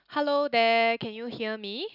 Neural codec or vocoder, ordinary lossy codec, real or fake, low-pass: none; none; real; 5.4 kHz